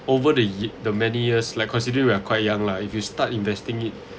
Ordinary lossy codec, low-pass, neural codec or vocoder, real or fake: none; none; none; real